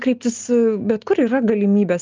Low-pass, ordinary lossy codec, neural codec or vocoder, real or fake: 7.2 kHz; Opus, 24 kbps; none; real